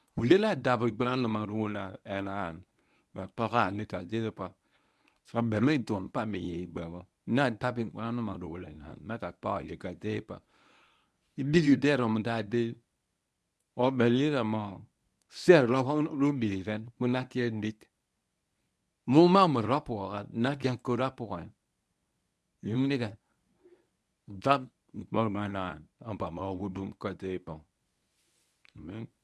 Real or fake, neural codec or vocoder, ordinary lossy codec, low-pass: fake; codec, 24 kHz, 0.9 kbps, WavTokenizer, medium speech release version 1; none; none